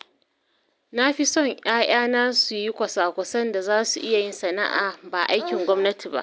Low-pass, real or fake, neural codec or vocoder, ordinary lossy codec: none; real; none; none